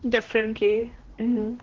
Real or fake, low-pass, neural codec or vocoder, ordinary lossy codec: fake; 7.2 kHz; codec, 16 kHz, 1 kbps, X-Codec, HuBERT features, trained on general audio; Opus, 16 kbps